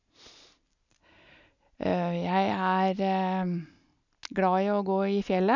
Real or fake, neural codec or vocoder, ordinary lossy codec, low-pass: real; none; none; 7.2 kHz